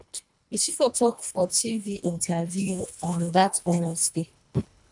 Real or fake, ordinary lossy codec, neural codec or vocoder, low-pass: fake; none; codec, 24 kHz, 1.5 kbps, HILCodec; 10.8 kHz